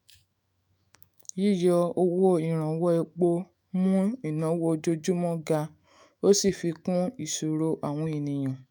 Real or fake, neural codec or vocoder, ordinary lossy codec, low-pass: fake; autoencoder, 48 kHz, 128 numbers a frame, DAC-VAE, trained on Japanese speech; none; none